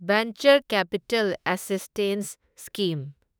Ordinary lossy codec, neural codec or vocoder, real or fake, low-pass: none; autoencoder, 48 kHz, 32 numbers a frame, DAC-VAE, trained on Japanese speech; fake; none